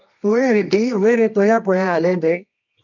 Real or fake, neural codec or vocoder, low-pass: fake; codec, 24 kHz, 0.9 kbps, WavTokenizer, medium music audio release; 7.2 kHz